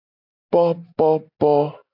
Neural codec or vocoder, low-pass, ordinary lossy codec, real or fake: none; 5.4 kHz; AAC, 48 kbps; real